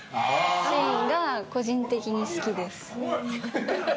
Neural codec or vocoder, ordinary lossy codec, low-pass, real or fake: none; none; none; real